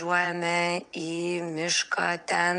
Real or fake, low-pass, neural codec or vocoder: fake; 9.9 kHz; vocoder, 22.05 kHz, 80 mel bands, WaveNeXt